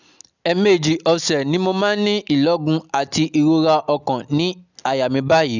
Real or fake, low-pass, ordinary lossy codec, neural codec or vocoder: real; 7.2 kHz; none; none